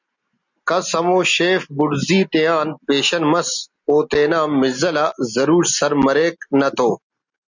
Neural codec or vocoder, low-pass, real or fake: none; 7.2 kHz; real